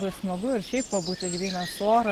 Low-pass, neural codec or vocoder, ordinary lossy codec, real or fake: 14.4 kHz; none; Opus, 16 kbps; real